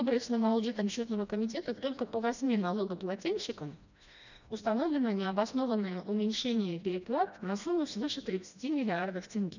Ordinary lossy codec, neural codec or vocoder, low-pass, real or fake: none; codec, 16 kHz, 1 kbps, FreqCodec, smaller model; 7.2 kHz; fake